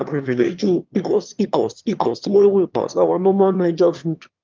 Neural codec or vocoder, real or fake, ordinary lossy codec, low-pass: autoencoder, 22.05 kHz, a latent of 192 numbers a frame, VITS, trained on one speaker; fake; Opus, 24 kbps; 7.2 kHz